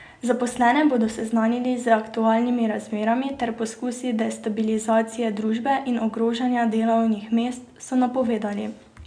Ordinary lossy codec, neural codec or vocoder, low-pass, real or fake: none; none; 9.9 kHz; real